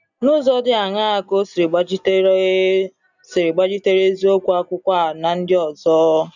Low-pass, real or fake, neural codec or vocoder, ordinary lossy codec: 7.2 kHz; real; none; none